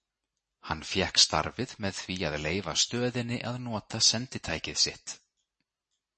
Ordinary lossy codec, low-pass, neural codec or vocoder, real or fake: MP3, 32 kbps; 10.8 kHz; none; real